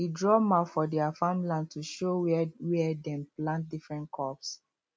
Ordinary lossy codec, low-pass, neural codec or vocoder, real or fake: none; none; none; real